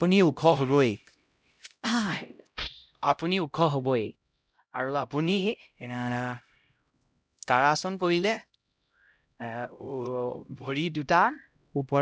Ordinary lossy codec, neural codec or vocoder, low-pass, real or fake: none; codec, 16 kHz, 0.5 kbps, X-Codec, HuBERT features, trained on LibriSpeech; none; fake